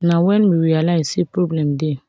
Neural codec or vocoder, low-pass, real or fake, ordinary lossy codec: none; none; real; none